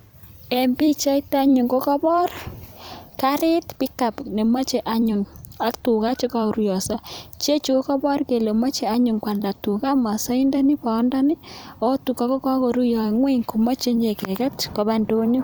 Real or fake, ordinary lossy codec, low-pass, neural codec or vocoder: fake; none; none; vocoder, 44.1 kHz, 128 mel bands every 256 samples, BigVGAN v2